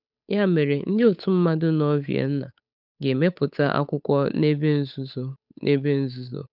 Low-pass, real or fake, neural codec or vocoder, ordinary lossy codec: 5.4 kHz; fake; codec, 16 kHz, 8 kbps, FunCodec, trained on Chinese and English, 25 frames a second; none